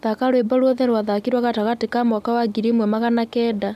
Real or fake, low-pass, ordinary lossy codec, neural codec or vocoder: real; 14.4 kHz; none; none